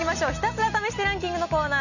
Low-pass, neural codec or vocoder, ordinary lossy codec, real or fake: 7.2 kHz; none; none; real